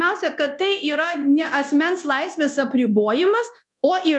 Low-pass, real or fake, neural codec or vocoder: 10.8 kHz; fake; codec, 24 kHz, 0.9 kbps, DualCodec